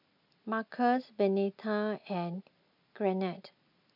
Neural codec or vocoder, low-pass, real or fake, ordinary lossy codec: none; 5.4 kHz; real; MP3, 48 kbps